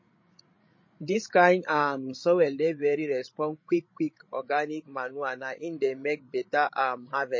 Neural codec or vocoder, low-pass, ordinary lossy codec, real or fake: codec, 16 kHz, 16 kbps, FreqCodec, larger model; 7.2 kHz; MP3, 32 kbps; fake